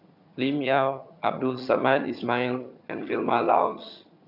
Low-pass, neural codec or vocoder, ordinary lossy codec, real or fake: 5.4 kHz; vocoder, 22.05 kHz, 80 mel bands, HiFi-GAN; none; fake